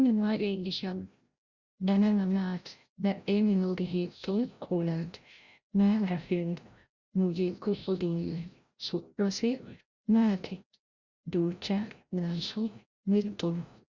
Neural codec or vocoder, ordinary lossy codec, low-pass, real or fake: codec, 16 kHz, 0.5 kbps, FreqCodec, larger model; Opus, 64 kbps; 7.2 kHz; fake